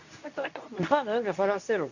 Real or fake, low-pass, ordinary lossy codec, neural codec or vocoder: fake; 7.2 kHz; none; codec, 24 kHz, 0.9 kbps, WavTokenizer, medium speech release version 2